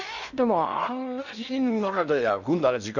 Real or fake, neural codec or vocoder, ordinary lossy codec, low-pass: fake; codec, 16 kHz in and 24 kHz out, 0.6 kbps, FocalCodec, streaming, 4096 codes; none; 7.2 kHz